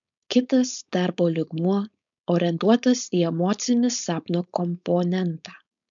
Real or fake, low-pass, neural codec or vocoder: fake; 7.2 kHz; codec, 16 kHz, 4.8 kbps, FACodec